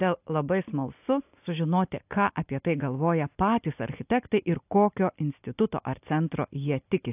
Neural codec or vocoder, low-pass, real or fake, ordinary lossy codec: none; 3.6 kHz; real; AAC, 32 kbps